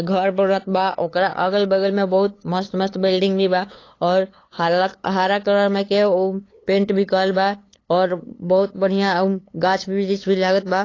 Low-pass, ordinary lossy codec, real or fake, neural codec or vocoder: 7.2 kHz; AAC, 32 kbps; fake; codec, 16 kHz, 8 kbps, FunCodec, trained on LibriTTS, 25 frames a second